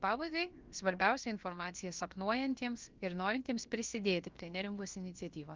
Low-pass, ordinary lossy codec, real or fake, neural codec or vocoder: 7.2 kHz; Opus, 24 kbps; fake; codec, 16 kHz, about 1 kbps, DyCAST, with the encoder's durations